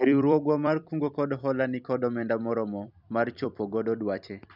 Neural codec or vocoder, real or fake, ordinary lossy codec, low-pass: vocoder, 44.1 kHz, 128 mel bands every 256 samples, BigVGAN v2; fake; none; 5.4 kHz